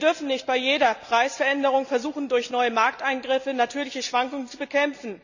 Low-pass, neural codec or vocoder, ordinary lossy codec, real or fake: 7.2 kHz; none; none; real